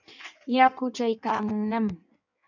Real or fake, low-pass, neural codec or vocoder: fake; 7.2 kHz; codec, 16 kHz in and 24 kHz out, 1.1 kbps, FireRedTTS-2 codec